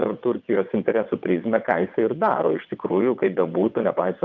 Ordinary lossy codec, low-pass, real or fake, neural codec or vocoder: Opus, 24 kbps; 7.2 kHz; fake; vocoder, 22.05 kHz, 80 mel bands, WaveNeXt